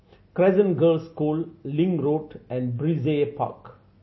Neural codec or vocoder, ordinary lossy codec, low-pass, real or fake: none; MP3, 24 kbps; 7.2 kHz; real